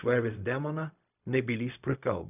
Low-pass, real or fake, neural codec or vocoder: 3.6 kHz; fake; codec, 16 kHz, 0.4 kbps, LongCat-Audio-Codec